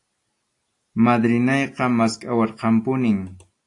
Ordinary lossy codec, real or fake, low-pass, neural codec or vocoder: AAC, 48 kbps; real; 10.8 kHz; none